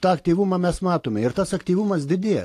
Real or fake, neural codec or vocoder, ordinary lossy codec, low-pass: real; none; AAC, 64 kbps; 14.4 kHz